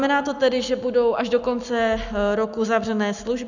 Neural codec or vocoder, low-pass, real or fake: none; 7.2 kHz; real